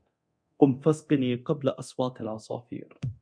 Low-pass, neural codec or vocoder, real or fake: 9.9 kHz; codec, 24 kHz, 0.9 kbps, DualCodec; fake